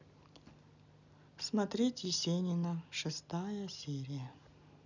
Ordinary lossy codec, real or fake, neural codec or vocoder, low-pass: none; real; none; 7.2 kHz